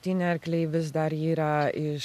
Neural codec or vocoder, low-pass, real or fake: none; 14.4 kHz; real